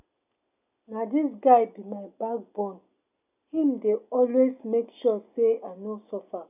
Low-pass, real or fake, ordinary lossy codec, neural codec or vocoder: 3.6 kHz; real; none; none